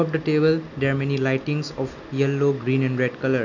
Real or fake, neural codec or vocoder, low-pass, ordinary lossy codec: real; none; 7.2 kHz; none